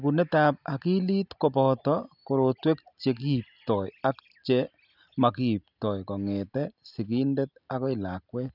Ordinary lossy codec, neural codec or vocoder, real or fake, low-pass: none; none; real; 5.4 kHz